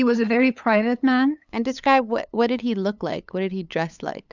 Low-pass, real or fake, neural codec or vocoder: 7.2 kHz; fake; codec, 16 kHz, 8 kbps, FunCodec, trained on LibriTTS, 25 frames a second